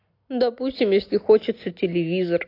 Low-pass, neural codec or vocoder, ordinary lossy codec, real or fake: 5.4 kHz; none; AAC, 32 kbps; real